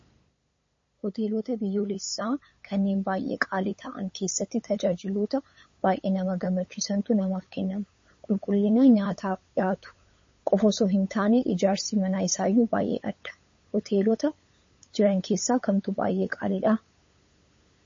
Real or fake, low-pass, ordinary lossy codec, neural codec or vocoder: fake; 7.2 kHz; MP3, 32 kbps; codec, 16 kHz, 8 kbps, FunCodec, trained on LibriTTS, 25 frames a second